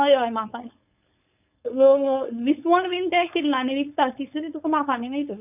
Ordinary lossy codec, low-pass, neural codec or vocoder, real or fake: none; 3.6 kHz; codec, 16 kHz, 4.8 kbps, FACodec; fake